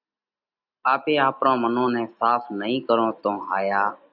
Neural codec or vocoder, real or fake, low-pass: none; real; 5.4 kHz